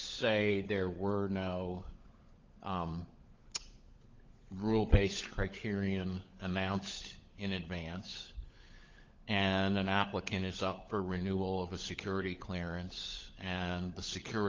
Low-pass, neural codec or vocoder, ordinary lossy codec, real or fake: 7.2 kHz; codec, 16 kHz, 16 kbps, FunCodec, trained on LibriTTS, 50 frames a second; Opus, 24 kbps; fake